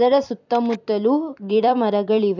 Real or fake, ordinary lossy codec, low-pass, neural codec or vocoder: fake; none; 7.2 kHz; vocoder, 44.1 kHz, 128 mel bands every 256 samples, BigVGAN v2